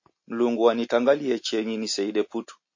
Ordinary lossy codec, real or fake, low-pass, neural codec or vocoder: MP3, 32 kbps; real; 7.2 kHz; none